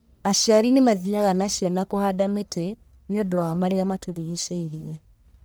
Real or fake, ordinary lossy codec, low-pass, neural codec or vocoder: fake; none; none; codec, 44.1 kHz, 1.7 kbps, Pupu-Codec